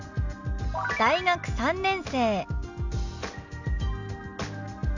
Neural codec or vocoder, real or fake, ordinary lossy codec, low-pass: none; real; none; 7.2 kHz